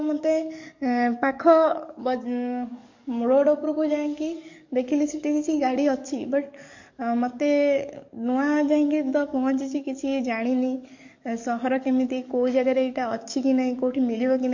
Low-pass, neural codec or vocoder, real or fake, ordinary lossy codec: 7.2 kHz; codec, 44.1 kHz, 7.8 kbps, DAC; fake; MP3, 48 kbps